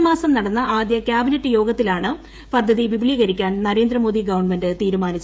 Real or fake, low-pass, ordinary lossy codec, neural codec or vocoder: fake; none; none; codec, 16 kHz, 16 kbps, FreqCodec, smaller model